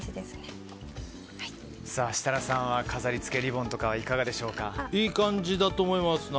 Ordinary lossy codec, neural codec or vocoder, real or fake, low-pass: none; none; real; none